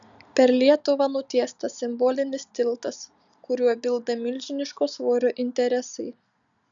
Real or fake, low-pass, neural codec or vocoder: real; 7.2 kHz; none